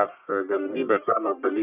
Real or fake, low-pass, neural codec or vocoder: fake; 3.6 kHz; codec, 44.1 kHz, 1.7 kbps, Pupu-Codec